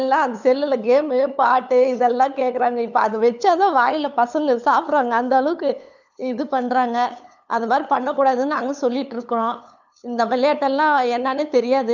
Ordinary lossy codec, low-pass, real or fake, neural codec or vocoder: none; 7.2 kHz; fake; codec, 16 kHz, 8 kbps, FunCodec, trained on LibriTTS, 25 frames a second